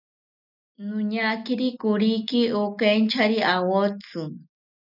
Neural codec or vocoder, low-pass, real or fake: none; 5.4 kHz; real